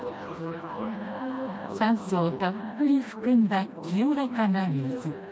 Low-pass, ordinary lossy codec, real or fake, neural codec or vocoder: none; none; fake; codec, 16 kHz, 1 kbps, FreqCodec, smaller model